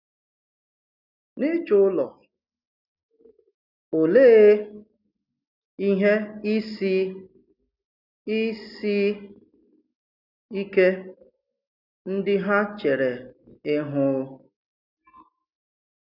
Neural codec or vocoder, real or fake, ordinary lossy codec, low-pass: none; real; none; 5.4 kHz